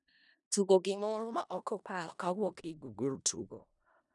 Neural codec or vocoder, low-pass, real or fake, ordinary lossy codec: codec, 16 kHz in and 24 kHz out, 0.4 kbps, LongCat-Audio-Codec, four codebook decoder; 10.8 kHz; fake; none